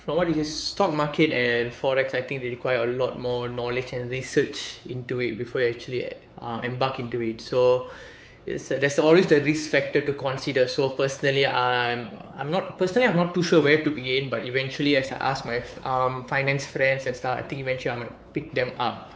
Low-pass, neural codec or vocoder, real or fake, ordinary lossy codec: none; codec, 16 kHz, 4 kbps, X-Codec, WavLM features, trained on Multilingual LibriSpeech; fake; none